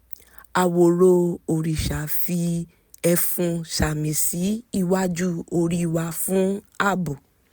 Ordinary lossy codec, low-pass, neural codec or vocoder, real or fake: none; none; none; real